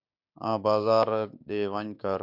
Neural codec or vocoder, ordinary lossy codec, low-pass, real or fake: codec, 16 kHz, 6 kbps, DAC; MP3, 48 kbps; 5.4 kHz; fake